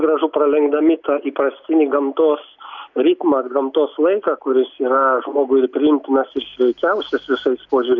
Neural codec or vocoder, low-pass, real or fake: none; 7.2 kHz; real